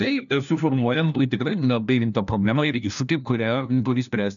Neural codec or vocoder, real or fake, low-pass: codec, 16 kHz, 1 kbps, FunCodec, trained on LibriTTS, 50 frames a second; fake; 7.2 kHz